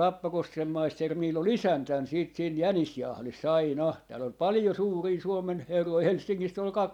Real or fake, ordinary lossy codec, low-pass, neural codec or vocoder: fake; none; 19.8 kHz; vocoder, 44.1 kHz, 128 mel bands every 256 samples, BigVGAN v2